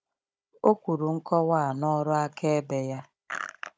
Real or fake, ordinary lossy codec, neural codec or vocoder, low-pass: fake; none; codec, 16 kHz, 16 kbps, FunCodec, trained on Chinese and English, 50 frames a second; none